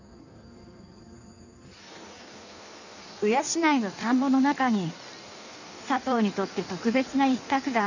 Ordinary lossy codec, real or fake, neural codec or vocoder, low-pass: none; fake; codec, 16 kHz in and 24 kHz out, 1.1 kbps, FireRedTTS-2 codec; 7.2 kHz